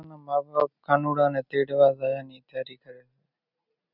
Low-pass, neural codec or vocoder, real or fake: 5.4 kHz; none; real